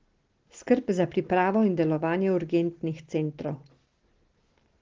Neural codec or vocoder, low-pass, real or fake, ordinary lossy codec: none; 7.2 kHz; real; Opus, 16 kbps